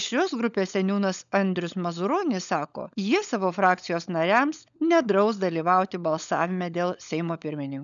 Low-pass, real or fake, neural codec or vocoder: 7.2 kHz; fake; codec, 16 kHz, 16 kbps, FunCodec, trained on LibriTTS, 50 frames a second